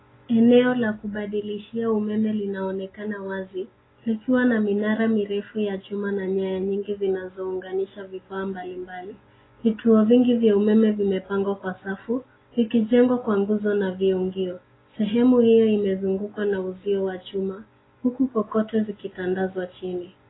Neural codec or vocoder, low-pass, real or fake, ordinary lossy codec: none; 7.2 kHz; real; AAC, 16 kbps